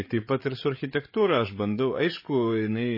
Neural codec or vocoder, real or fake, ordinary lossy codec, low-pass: codec, 16 kHz, 8 kbps, FreqCodec, larger model; fake; MP3, 24 kbps; 5.4 kHz